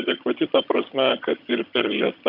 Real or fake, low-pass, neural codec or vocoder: fake; 5.4 kHz; vocoder, 22.05 kHz, 80 mel bands, HiFi-GAN